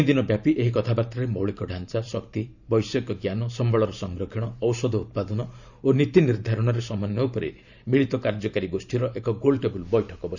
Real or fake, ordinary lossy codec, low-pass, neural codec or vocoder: fake; none; 7.2 kHz; vocoder, 44.1 kHz, 128 mel bands every 512 samples, BigVGAN v2